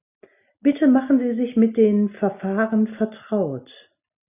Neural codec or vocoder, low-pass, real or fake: none; 3.6 kHz; real